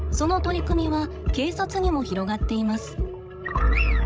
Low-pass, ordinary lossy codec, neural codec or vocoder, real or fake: none; none; codec, 16 kHz, 16 kbps, FreqCodec, larger model; fake